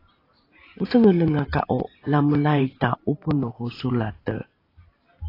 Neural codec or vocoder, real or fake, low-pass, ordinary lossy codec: vocoder, 44.1 kHz, 128 mel bands every 512 samples, BigVGAN v2; fake; 5.4 kHz; AAC, 24 kbps